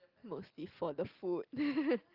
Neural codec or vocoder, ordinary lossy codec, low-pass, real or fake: none; Opus, 32 kbps; 5.4 kHz; real